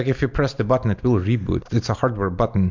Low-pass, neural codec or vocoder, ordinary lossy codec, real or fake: 7.2 kHz; none; MP3, 64 kbps; real